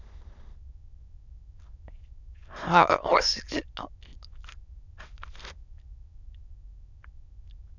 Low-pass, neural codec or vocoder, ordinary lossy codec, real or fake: 7.2 kHz; autoencoder, 22.05 kHz, a latent of 192 numbers a frame, VITS, trained on many speakers; none; fake